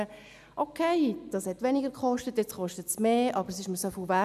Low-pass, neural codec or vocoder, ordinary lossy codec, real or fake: 14.4 kHz; none; none; real